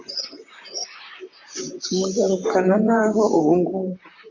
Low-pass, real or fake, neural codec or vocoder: 7.2 kHz; fake; vocoder, 44.1 kHz, 128 mel bands, Pupu-Vocoder